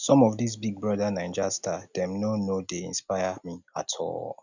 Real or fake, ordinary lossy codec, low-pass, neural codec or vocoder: real; none; 7.2 kHz; none